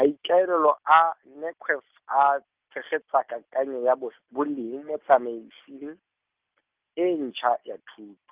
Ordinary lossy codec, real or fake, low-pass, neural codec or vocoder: Opus, 24 kbps; real; 3.6 kHz; none